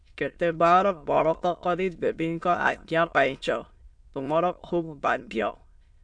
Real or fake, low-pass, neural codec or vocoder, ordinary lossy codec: fake; 9.9 kHz; autoencoder, 22.05 kHz, a latent of 192 numbers a frame, VITS, trained on many speakers; MP3, 64 kbps